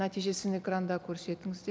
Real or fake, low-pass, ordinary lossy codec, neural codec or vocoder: real; none; none; none